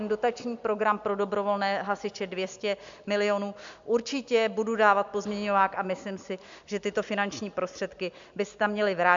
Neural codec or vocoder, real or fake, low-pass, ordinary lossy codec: none; real; 7.2 kHz; MP3, 64 kbps